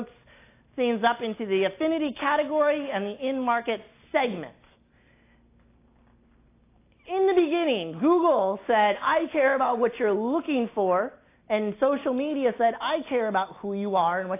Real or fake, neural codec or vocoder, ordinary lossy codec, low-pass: real; none; AAC, 24 kbps; 3.6 kHz